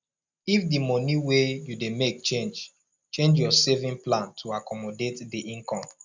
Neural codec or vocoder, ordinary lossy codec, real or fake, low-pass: none; none; real; none